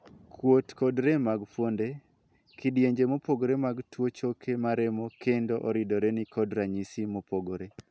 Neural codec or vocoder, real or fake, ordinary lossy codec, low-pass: none; real; none; none